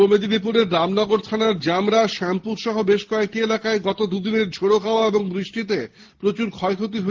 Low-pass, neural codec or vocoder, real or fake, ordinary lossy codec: 7.2 kHz; none; real; Opus, 16 kbps